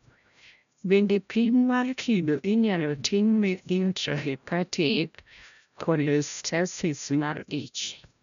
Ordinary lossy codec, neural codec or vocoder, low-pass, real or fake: none; codec, 16 kHz, 0.5 kbps, FreqCodec, larger model; 7.2 kHz; fake